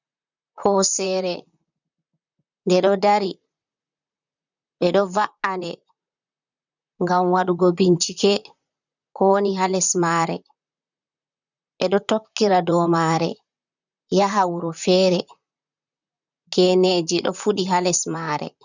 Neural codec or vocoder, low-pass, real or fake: vocoder, 44.1 kHz, 128 mel bands, Pupu-Vocoder; 7.2 kHz; fake